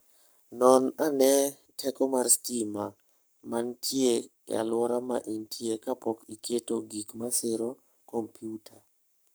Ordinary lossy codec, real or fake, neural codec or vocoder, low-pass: none; fake; codec, 44.1 kHz, 7.8 kbps, Pupu-Codec; none